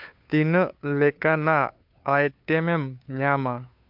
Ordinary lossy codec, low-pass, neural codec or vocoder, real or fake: MP3, 48 kbps; 5.4 kHz; codec, 16 kHz, 2 kbps, FunCodec, trained on Chinese and English, 25 frames a second; fake